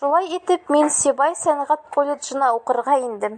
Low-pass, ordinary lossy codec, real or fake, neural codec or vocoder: 9.9 kHz; AAC, 64 kbps; real; none